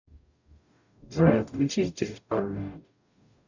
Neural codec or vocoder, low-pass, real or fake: codec, 44.1 kHz, 0.9 kbps, DAC; 7.2 kHz; fake